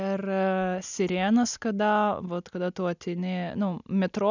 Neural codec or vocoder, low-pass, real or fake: none; 7.2 kHz; real